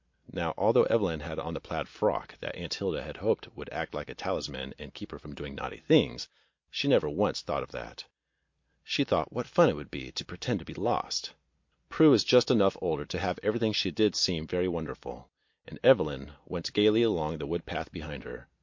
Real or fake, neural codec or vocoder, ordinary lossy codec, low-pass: real; none; MP3, 48 kbps; 7.2 kHz